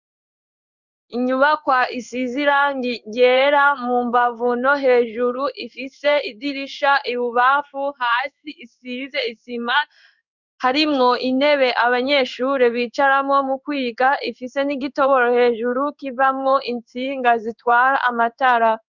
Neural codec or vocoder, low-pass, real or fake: codec, 16 kHz in and 24 kHz out, 1 kbps, XY-Tokenizer; 7.2 kHz; fake